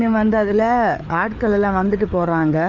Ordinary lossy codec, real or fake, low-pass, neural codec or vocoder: none; fake; 7.2 kHz; codec, 16 kHz, 4 kbps, FreqCodec, larger model